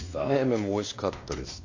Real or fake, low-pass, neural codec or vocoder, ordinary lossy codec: fake; 7.2 kHz; codec, 16 kHz, 2 kbps, X-Codec, WavLM features, trained on Multilingual LibriSpeech; AAC, 48 kbps